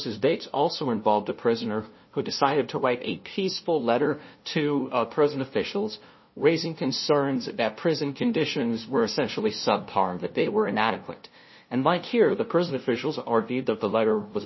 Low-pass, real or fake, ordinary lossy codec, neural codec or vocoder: 7.2 kHz; fake; MP3, 24 kbps; codec, 16 kHz, 0.5 kbps, FunCodec, trained on LibriTTS, 25 frames a second